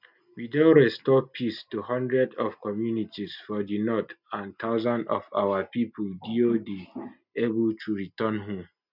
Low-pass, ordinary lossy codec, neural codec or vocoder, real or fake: 5.4 kHz; none; none; real